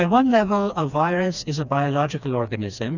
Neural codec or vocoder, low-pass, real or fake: codec, 16 kHz, 2 kbps, FreqCodec, smaller model; 7.2 kHz; fake